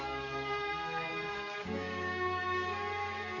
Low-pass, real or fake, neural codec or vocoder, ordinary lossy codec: 7.2 kHz; fake; codec, 44.1 kHz, 7.8 kbps, DAC; none